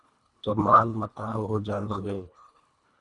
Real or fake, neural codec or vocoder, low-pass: fake; codec, 24 kHz, 1.5 kbps, HILCodec; 10.8 kHz